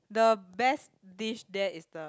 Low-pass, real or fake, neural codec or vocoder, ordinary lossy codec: none; real; none; none